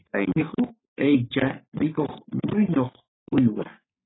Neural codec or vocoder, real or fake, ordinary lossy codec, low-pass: codec, 44.1 kHz, 3.4 kbps, Pupu-Codec; fake; AAC, 16 kbps; 7.2 kHz